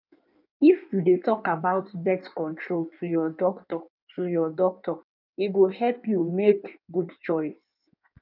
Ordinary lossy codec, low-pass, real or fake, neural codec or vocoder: none; 5.4 kHz; fake; codec, 16 kHz in and 24 kHz out, 1.1 kbps, FireRedTTS-2 codec